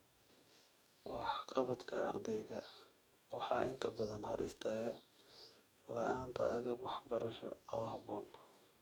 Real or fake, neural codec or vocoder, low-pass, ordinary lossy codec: fake; codec, 44.1 kHz, 2.6 kbps, DAC; none; none